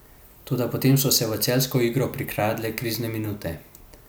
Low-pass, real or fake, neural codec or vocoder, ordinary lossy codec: none; real; none; none